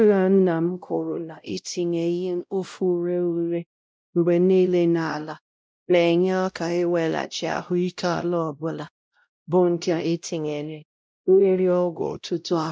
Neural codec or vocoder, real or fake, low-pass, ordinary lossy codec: codec, 16 kHz, 0.5 kbps, X-Codec, WavLM features, trained on Multilingual LibriSpeech; fake; none; none